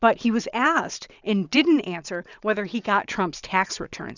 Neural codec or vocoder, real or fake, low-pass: vocoder, 22.05 kHz, 80 mel bands, WaveNeXt; fake; 7.2 kHz